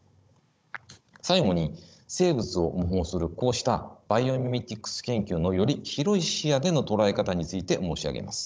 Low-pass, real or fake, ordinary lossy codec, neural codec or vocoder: none; fake; none; codec, 16 kHz, 16 kbps, FunCodec, trained on Chinese and English, 50 frames a second